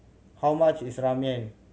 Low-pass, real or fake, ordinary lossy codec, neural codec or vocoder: none; real; none; none